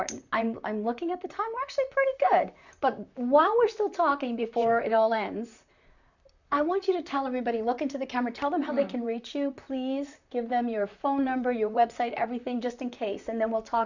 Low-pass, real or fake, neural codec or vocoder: 7.2 kHz; fake; vocoder, 44.1 kHz, 128 mel bands, Pupu-Vocoder